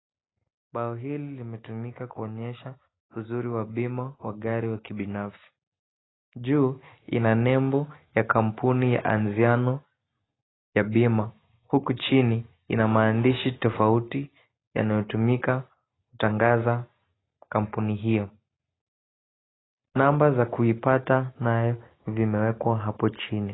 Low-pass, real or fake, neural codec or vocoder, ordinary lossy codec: 7.2 kHz; real; none; AAC, 16 kbps